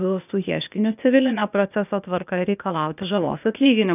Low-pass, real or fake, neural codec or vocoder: 3.6 kHz; fake; codec, 16 kHz, 0.8 kbps, ZipCodec